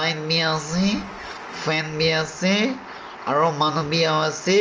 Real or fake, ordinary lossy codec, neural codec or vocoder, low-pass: real; Opus, 24 kbps; none; 7.2 kHz